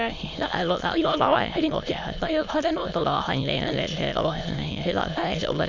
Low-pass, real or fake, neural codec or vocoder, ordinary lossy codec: 7.2 kHz; fake; autoencoder, 22.05 kHz, a latent of 192 numbers a frame, VITS, trained on many speakers; MP3, 64 kbps